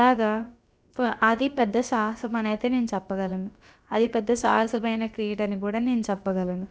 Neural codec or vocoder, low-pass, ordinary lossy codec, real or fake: codec, 16 kHz, about 1 kbps, DyCAST, with the encoder's durations; none; none; fake